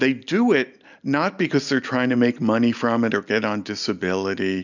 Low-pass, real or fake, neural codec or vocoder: 7.2 kHz; real; none